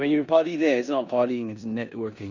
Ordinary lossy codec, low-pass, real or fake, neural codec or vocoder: Opus, 64 kbps; 7.2 kHz; fake; codec, 16 kHz in and 24 kHz out, 0.9 kbps, LongCat-Audio-Codec, four codebook decoder